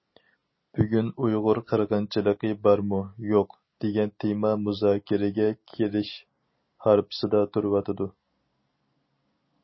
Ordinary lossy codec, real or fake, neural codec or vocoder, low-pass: MP3, 24 kbps; real; none; 7.2 kHz